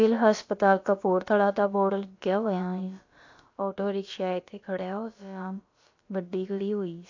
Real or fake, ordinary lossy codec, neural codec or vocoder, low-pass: fake; AAC, 48 kbps; codec, 16 kHz, about 1 kbps, DyCAST, with the encoder's durations; 7.2 kHz